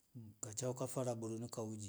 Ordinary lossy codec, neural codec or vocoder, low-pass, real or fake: none; none; none; real